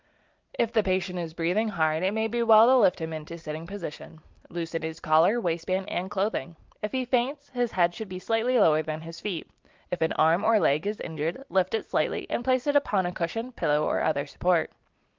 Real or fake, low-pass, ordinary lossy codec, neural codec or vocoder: real; 7.2 kHz; Opus, 24 kbps; none